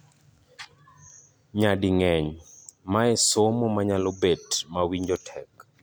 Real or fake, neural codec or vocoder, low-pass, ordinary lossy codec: real; none; none; none